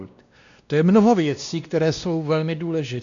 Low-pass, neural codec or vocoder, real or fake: 7.2 kHz; codec, 16 kHz, 1 kbps, X-Codec, WavLM features, trained on Multilingual LibriSpeech; fake